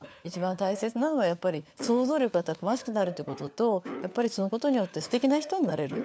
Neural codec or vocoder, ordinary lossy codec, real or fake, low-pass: codec, 16 kHz, 4 kbps, FunCodec, trained on LibriTTS, 50 frames a second; none; fake; none